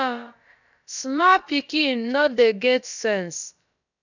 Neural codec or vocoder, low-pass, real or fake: codec, 16 kHz, about 1 kbps, DyCAST, with the encoder's durations; 7.2 kHz; fake